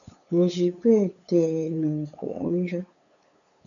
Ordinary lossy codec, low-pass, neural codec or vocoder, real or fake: MP3, 96 kbps; 7.2 kHz; codec, 16 kHz, 8 kbps, FunCodec, trained on LibriTTS, 25 frames a second; fake